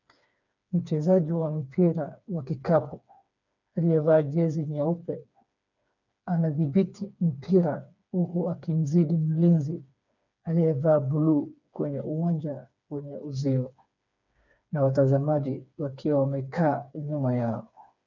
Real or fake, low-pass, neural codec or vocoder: fake; 7.2 kHz; codec, 16 kHz, 4 kbps, FreqCodec, smaller model